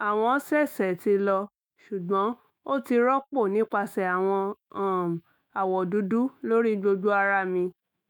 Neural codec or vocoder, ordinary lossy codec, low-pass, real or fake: autoencoder, 48 kHz, 128 numbers a frame, DAC-VAE, trained on Japanese speech; none; none; fake